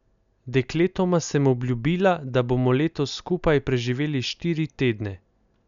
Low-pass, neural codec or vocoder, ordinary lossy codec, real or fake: 7.2 kHz; none; none; real